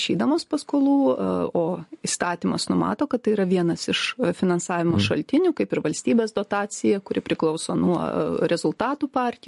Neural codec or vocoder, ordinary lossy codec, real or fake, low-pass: none; MP3, 48 kbps; real; 14.4 kHz